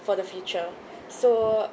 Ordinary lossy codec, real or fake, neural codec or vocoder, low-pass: none; real; none; none